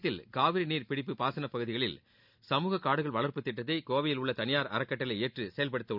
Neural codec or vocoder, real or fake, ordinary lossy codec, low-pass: none; real; none; 5.4 kHz